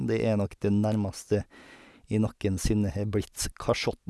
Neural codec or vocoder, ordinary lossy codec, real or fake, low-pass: none; none; real; none